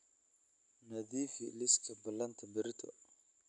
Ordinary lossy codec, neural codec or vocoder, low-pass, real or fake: none; none; 10.8 kHz; real